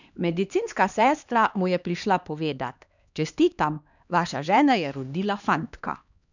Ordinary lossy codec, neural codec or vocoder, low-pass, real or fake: none; codec, 16 kHz, 2 kbps, X-Codec, HuBERT features, trained on LibriSpeech; 7.2 kHz; fake